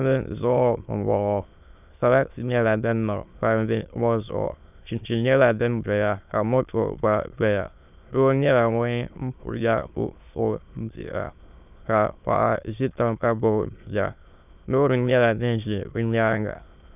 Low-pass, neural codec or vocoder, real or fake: 3.6 kHz; autoencoder, 22.05 kHz, a latent of 192 numbers a frame, VITS, trained on many speakers; fake